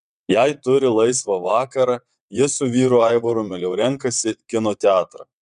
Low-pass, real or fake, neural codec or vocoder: 9.9 kHz; fake; vocoder, 22.05 kHz, 80 mel bands, WaveNeXt